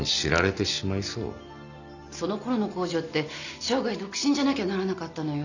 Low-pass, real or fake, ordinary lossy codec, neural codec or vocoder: 7.2 kHz; real; none; none